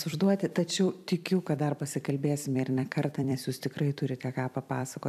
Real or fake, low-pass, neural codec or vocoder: fake; 14.4 kHz; vocoder, 44.1 kHz, 128 mel bands every 256 samples, BigVGAN v2